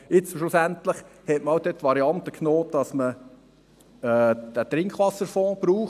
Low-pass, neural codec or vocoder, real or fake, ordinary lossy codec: 14.4 kHz; none; real; none